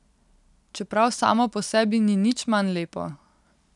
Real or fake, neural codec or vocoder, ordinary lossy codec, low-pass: real; none; none; 10.8 kHz